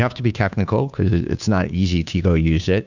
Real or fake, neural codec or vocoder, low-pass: fake; codec, 16 kHz, 2 kbps, FunCodec, trained on Chinese and English, 25 frames a second; 7.2 kHz